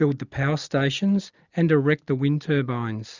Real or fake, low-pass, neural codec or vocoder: real; 7.2 kHz; none